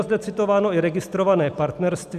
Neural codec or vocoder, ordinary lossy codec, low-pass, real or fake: none; AAC, 96 kbps; 14.4 kHz; real